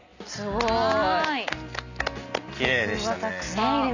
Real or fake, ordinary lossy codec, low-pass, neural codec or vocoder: real; none; 7.2 kHz; none